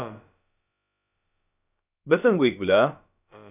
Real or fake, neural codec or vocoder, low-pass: fake; codec, 16 kHz, about 1 kbps, DyCAST, with the encoder's durations; 3.6 kHz